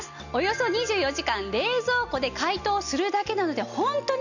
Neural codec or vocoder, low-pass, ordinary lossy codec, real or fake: none; 7.2 kHz; none; real